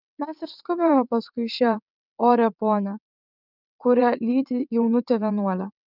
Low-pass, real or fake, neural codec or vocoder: 5.4 kHz; fake; vocoder, 22.05 kHz, 80 mel bands, WaveNeXt